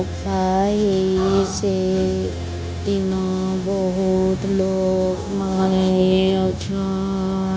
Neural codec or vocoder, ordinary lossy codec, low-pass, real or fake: codec, 16 kHz, 0.9 kbps, LongCat-Audio-Codec; none; none; fake